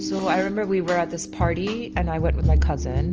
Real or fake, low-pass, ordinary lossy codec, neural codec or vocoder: real; 7.2 kHz; Opus, 24 kbps; none